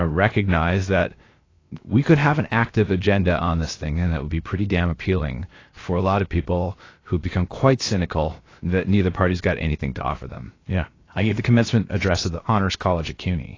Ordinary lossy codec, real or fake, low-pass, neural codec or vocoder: AAC, 32 kbps; fake; 7.2 kHz; codec, 16 kHz, 0.7 kbps, FocalCodec